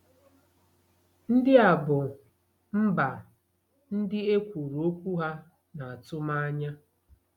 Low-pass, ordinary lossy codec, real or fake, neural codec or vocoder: 19.8 kHz; none; real; none